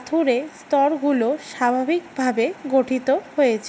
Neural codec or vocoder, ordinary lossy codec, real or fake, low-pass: none; none; real; none